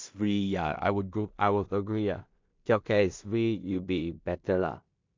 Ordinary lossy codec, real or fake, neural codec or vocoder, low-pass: MP3, 64 kbps; fake; codec, 16 kHz in and 24 kHz out, 0.4 kbps, LongCat-Audio-Codec, two codebook decoder; 7.2 kHz